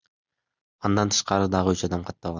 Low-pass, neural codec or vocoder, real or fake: 7.2 kHz; none; real